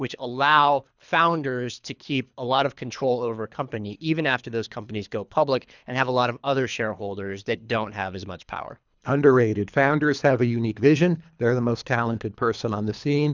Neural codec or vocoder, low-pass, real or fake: codec, 24 kHz, 3 kbps, HILCodec; 7.2 kHz; fake